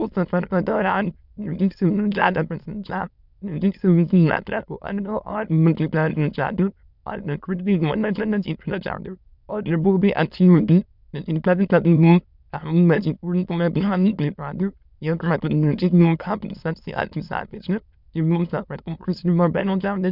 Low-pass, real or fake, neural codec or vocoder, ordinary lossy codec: 5.4 kHz; fake; autoencoder, 22.05 kHz, a latent of 192 numbers a frame, VITS, trained on many speakers; none